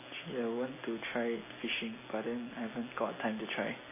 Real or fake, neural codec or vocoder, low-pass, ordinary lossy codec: real; none; 3.6 kHz; MP3, 16 kbps